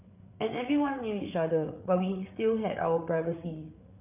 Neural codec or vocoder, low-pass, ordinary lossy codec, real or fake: codec, 16 kHz, 8 kbps, FreqCodec, larger model; 3.6 kHz; none; fake